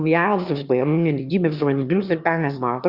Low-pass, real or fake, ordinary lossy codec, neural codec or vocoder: 5.4 kHz; fake; Opus, 64 kbps; autoencoder, 22.05 kHz, a latent of 192 numbers a frame, VITS, trained on one speaker